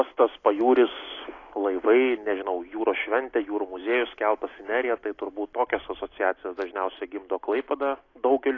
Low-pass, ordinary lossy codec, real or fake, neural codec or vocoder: 7.2 kHz; AAC, 32 kbps; real; none